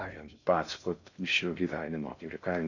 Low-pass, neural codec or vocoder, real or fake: 7.2 kHz; codec, 16 kHz in and 24 kHz out, 0.6 kbps, FocalCodec, streaming, 4096 codes; fake